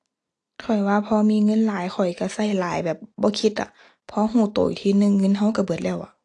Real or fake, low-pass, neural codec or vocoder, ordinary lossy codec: real; 10.8 kHz; none; AAC, 48 kbps